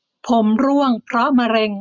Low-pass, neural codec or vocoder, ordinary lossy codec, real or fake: 7.2 kHz; none; none; real